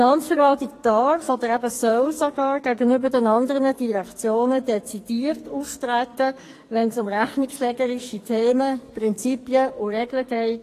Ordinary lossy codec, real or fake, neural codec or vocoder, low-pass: AAC, 48 kbps; fake; codec, 44.1 kHz, 2.6 kbps, SNAC; 14.4 kHz